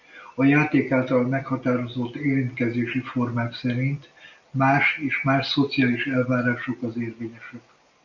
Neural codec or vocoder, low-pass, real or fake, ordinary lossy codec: none; 7.2 kHz; real; MP3, 64 kbps